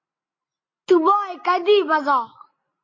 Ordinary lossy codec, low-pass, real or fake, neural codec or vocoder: MP3, 32 kbps; 7.2 kHz; real; none